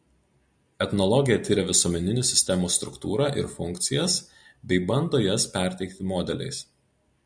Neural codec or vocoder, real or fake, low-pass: none; real; 9.9 kHz